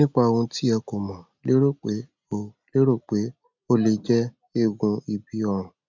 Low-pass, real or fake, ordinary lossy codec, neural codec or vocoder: 7.2 kHz; real; MP3, 64 kbps; none